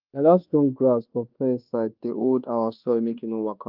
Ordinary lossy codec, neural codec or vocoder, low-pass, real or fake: Opus, 24 kbps; codec, 24 kHz, 1.2 kbps, DualCodec; 5.4 kHz; fake